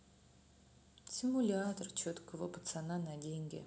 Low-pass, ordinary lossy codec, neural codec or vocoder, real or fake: none; none; none; real